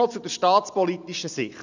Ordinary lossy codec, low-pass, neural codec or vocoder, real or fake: none; 7.2 kHz; none; real